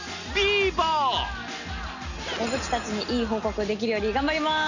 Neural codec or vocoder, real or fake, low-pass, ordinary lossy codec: none; real; 7.2 kHz; none